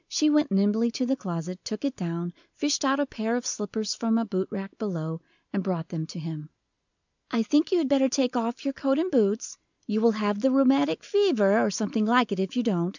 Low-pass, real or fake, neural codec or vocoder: 7.2 kHz; real; none